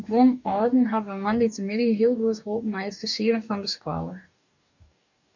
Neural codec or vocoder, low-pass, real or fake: codec, 44.1 kHz, 2.6 kbps, DAC; 7.2 kHz; fake